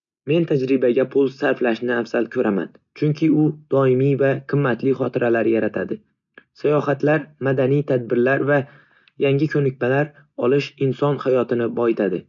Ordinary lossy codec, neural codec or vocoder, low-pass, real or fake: none; none; 7.2 kHz; real